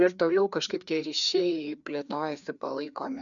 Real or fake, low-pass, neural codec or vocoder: fake; 7.2 kHz; codec, 16 kHz, 2 kbps, FreqCodec, larger model